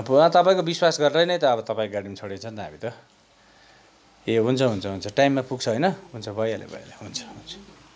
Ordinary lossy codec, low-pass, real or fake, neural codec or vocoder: none; none; real; none